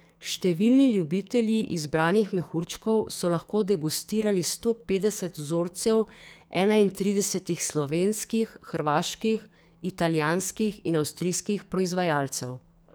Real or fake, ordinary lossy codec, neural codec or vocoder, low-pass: fake; none; codec, 44.1 kHz, 2.6 kbps, SNAC; none